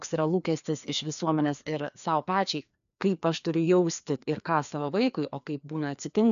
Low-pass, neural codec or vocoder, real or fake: 7.2 kHz; codec, 16 kHz, 2 kbps, FreqCodec, larger model; fake